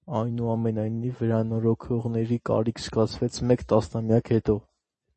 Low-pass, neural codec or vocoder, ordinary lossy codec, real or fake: 10.8 kHz; none; MP3, 32 kbps; real